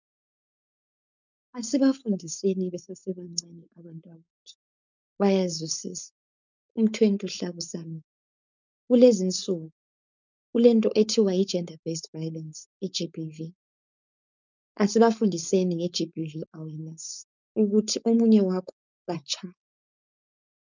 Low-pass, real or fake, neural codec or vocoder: 7.2 kHz; fake; codec, 16 kHz, 4.8 kbps, FACodec